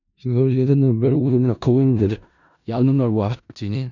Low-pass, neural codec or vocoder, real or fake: 7.2 kHz; codec, 16 kHz in and 24 kHz out, 0.4 kbps, LongCat-Audio-Codec, four codebook decoder; fake